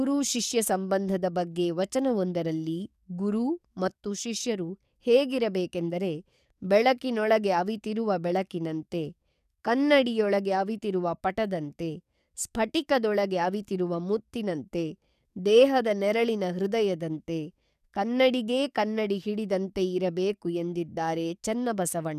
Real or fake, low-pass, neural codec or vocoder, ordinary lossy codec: fake; 14.4 kHz; codec, 44.1 kHz, 7.8 kbps, DAC; none